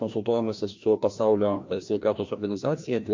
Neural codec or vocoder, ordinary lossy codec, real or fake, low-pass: codec, 16 kHz, 1 kbps, FreqCodec, larger model; MP3, 48 kbps; fake; 7.2 kHz